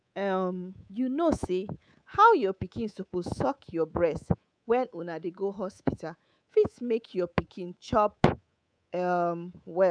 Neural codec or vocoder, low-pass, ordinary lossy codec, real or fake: codec, 24 kHz, 3.1 kbps, DualCodec; 9.9 kHz; none; fake